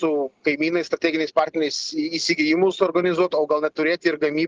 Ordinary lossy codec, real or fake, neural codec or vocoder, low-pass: Opus, 16 kbps; real; none; 7.2 kHz